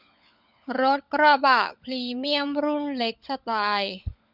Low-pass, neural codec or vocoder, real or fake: 5.4 kHz; codec, 16 kHz, 16 kbps, FunCodec, trained on LibriTTS, 50 frames a second; fake